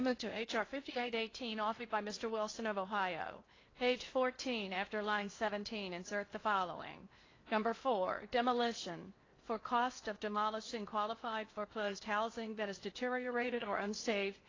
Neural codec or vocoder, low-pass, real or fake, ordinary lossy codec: codec, 16 kHz in and 24 kHz out, 0.8 kbps, FocalCodec, streaming, 65536 codes; 7.2 kHz; fake; AAC, 32 kbps